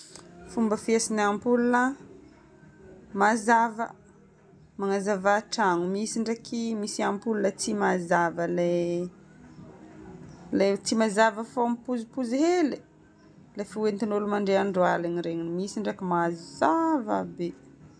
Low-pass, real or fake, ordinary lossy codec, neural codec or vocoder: none; real; none; none